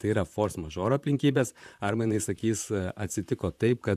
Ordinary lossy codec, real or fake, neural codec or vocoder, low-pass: AAC, 96 kbps; fake; vocoder, 44.1 kHz, 128 mel bands, Pupu-Vocoder; 14.4 kHz